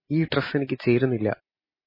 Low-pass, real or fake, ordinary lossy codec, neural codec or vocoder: 5.4 kHz; real; MP3, 24 kbps; none